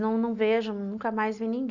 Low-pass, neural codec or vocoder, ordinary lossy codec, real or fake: 7.2 kHz; none; none; real